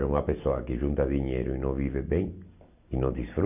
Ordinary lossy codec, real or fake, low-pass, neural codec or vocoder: none; real; 3.6 kHz; none